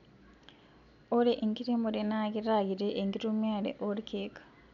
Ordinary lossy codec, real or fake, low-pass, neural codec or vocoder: none; real; 7.2 kHz; none